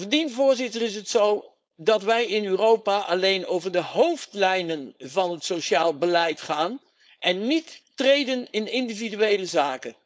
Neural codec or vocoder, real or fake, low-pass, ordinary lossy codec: codec, 16 kHz, 4.8 kbps, FACodec; fake; none; none